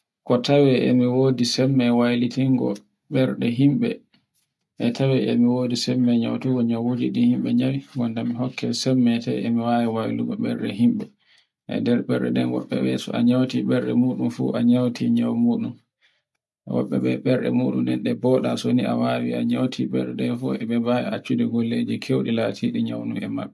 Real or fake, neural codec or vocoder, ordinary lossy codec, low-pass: real; none; none; none